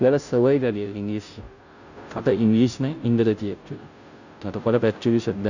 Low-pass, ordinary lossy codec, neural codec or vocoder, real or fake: 7.2 kHz; none; codec, 16 kHz, 0.5 kbps, FunCodec, trained on Chinese and English, 25 frames a second; fake